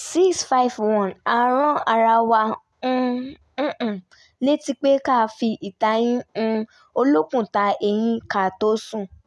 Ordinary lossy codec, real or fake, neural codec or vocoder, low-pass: none; real; none; none